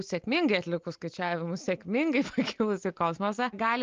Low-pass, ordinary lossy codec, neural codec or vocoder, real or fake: 7.2 kHz; Opus, 24 kbps; none; real